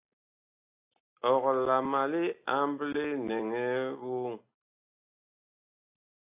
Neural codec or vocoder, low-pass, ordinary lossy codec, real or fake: none; 3.6 kHz; AAC, 32 kbps; real